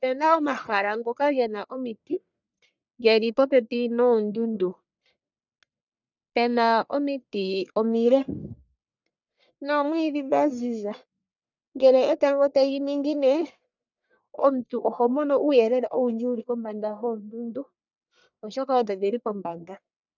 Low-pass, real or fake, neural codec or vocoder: 7.2 kHz; fake; codec, 44.1 kHz, 1.7 kbps, Pupu-Codec